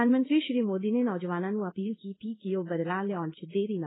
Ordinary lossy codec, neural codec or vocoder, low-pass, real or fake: AAC, 16 kbps; codec, 16 kHz in and 24 kHz out, 1 kbps, XY-Tokenizer; 7.2 kHz; fake